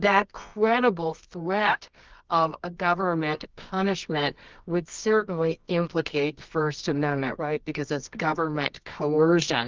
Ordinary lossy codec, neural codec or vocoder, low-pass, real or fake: Opus, 24 kbps; codec, 24 kHz, 0.9 kbps, WavTokenizer, medium music audio release; 7.2 kHz; fake